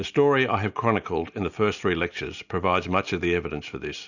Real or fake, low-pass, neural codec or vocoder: real; 7.2 kHz; none